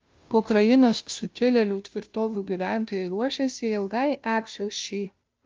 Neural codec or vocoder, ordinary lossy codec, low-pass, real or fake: codec, 16 kHz, 0.5 kbps, FunCodec, trained on Chinese and English, 25 frames a second; Opus, 32 kbps; 7.2 kHz; fake